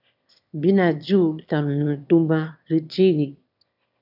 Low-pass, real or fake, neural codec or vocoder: 5.4 kHz; fake; autoencoder, 22.05 kHz, a latent of 192 numbers a frame, VITS, trained on one speaker